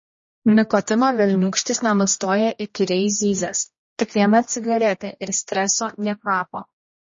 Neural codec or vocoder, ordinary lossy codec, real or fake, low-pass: codec, 16 kHz, 1 kbps, X-Codec, HuBERT features, trained on general audio; MP3, 32 kbps; fake; 7.2 kHz